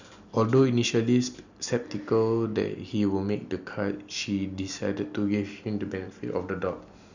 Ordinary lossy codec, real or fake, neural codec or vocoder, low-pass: none; real; none; 7.2 kHz